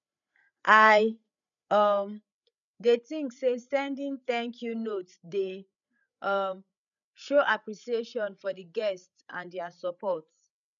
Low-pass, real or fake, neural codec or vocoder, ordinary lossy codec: 7.2 kHz; fake; codec, 16 kHz, 8 kbps, FreqCodec, larger model; none